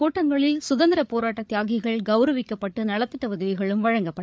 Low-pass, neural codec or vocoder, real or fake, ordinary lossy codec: none; codec, 16 kHz, 8 kbps, FreqCodec, larger model; fake; none